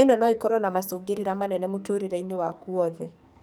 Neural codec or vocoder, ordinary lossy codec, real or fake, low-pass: codec, 44.1 kHz, 2.6 kbps, SNAC; none; fake; none